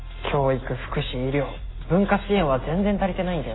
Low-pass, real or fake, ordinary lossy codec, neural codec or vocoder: 7.2 kHz; real; AAC, 16 kbps; none